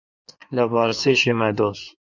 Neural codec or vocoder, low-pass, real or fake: codec, 16 kHz in and 24 kHz out, 1.1 kbps, FireRedTTS-2 codec; 7.2 kHz; fake